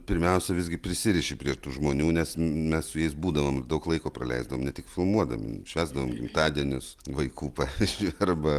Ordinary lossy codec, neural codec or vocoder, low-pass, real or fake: Opus, 32 kbps; none; 14.4 kHz; real